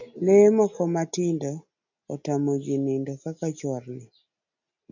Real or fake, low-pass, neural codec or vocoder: real; 7.2 kHz; none